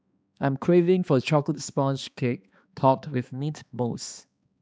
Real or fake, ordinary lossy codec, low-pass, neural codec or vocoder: fake; none; none; codec, 16 kHz, 2 kbps, X-Codec, HuBERT features, trained on balanced general audio